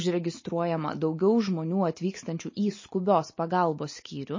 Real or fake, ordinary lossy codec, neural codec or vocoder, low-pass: real; MP3, 32 kbps; none; 7.2 kHz